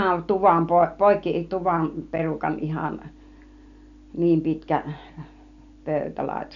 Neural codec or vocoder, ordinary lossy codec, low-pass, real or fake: none; none; 7.2 kHz; real